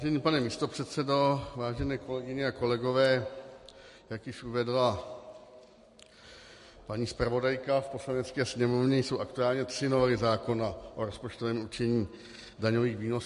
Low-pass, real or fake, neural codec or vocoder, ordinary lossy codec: 14.4 kHz; real; none; MP3, 48 kbps